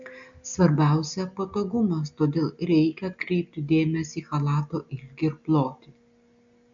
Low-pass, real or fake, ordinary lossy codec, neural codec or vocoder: 7.2 kHz; real; AAC, 64 kbps; none